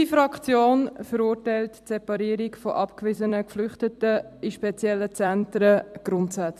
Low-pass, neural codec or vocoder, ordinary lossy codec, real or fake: 14.4 kHz; vocoder, 44.1 kHz, 128 mel bands every 256 samples, BigVGAN v2; MP3, 96 kbps; fake